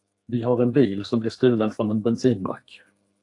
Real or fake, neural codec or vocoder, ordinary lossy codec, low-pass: fake; codec, 32 kHz, 1.9 kbps, SNAC; AAC, 48 kbps; 10.8 kHz